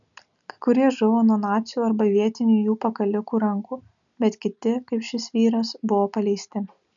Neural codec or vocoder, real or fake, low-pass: none; real; 7.2 kHz